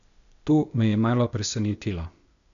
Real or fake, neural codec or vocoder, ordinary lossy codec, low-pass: fake; codec, 16 kHz, 0.8 kbps, ZipCodec; none; 7.2 kHz